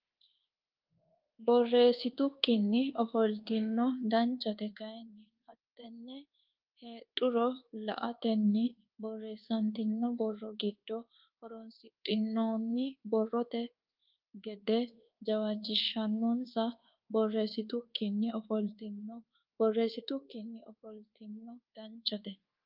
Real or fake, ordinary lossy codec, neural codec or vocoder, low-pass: fake; Opus, 24 kbps; codec, 24 kHz, 1.2 kbps, DualCodec; 5.4 kHz